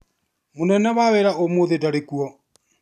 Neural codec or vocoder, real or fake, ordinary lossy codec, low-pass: none; real; none; 14.4 kHz